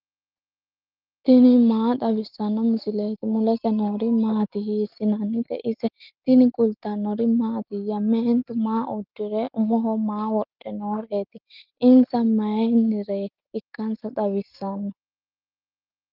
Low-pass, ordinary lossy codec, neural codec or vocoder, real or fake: 5.4 kHz; Opus, 32 kbps; none; real